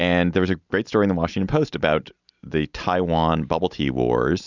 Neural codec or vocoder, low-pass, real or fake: none; 7.2 kHz; real